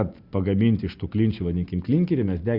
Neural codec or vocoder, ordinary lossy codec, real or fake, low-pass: none; Opus, 64 kbps; real; 5.4 kHz